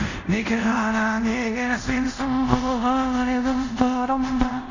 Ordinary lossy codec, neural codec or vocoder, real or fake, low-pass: none; codec, 24 kHz, 0.5 kbps, DualCodec; fake; 7.2 kHz